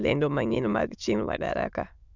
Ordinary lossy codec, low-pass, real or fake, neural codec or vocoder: none; 7.2 kHz; fake; autoencoder, 22.05 kHz, a latent of 192 numbers a frame, VITS, trained on many speakers